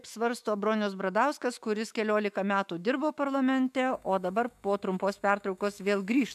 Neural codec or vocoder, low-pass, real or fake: autoencoder, 48 kHz, 128 numbers a frame, DAC-VAE, trained on Japanese speech; 14.4 kHz; fake